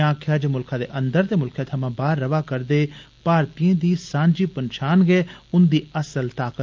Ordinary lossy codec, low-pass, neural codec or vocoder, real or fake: Opus, 24 kbps; 7.2 kHz; none; real